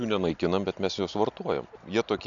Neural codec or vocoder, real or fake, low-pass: none; real; 7.2 kHz